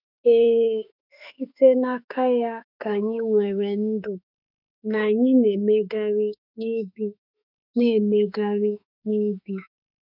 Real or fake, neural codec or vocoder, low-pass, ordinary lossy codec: fake; autoencoder, 48 kHz, 32 numbers a frame, DAC-VAE, trained on Japanese speech; 5.4 kHz; none